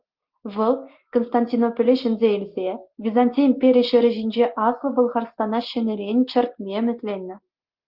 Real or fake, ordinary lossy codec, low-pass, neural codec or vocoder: real; Opus, 32 kbps; 5.4 kHz; none